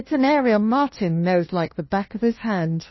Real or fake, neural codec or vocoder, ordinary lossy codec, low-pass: fake; codec, 16 kHz, 1 kbps, FunCodec, trained on LibriTTS, 50 frames a second; MP3, 24 kbps; 7.2 kHz